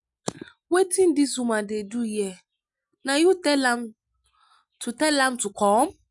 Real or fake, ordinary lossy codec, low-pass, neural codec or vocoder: real; none; 10.8 kHz; none